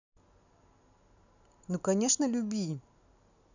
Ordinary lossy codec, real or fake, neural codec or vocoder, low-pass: none; real; none; 7.2 kHz